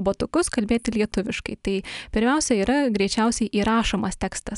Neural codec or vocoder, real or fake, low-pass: none; real; 10.8 kHz